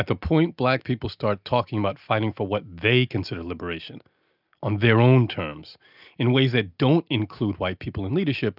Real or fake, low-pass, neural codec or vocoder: real; 5.4 kHz; none